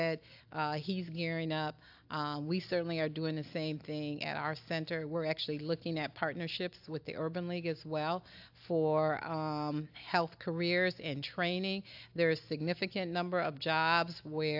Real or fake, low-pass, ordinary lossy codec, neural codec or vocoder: real; 5.4 kHz; AAC, 48 kbps; none